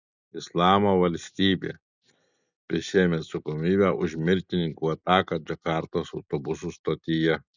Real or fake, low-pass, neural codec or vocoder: real; 7.2 kHz; none